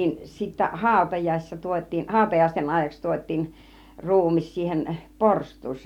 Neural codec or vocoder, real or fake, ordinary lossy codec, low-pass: none; real; MP3, 96 kbps; 19.8 kHz